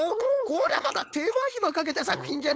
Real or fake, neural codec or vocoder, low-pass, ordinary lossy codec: fake; codec, 16 kHz, 4.8 kbps, FACodec; none; none